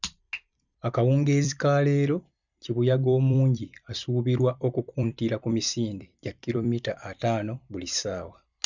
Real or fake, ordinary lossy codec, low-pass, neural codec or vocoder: fake; none; 7.2 kHz; vocoder, 44.1 kHz, 128 mel bands every 256 samples, BigVGAN v2